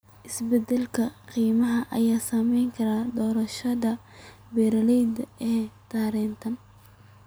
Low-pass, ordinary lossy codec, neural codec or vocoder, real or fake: none; none; none; real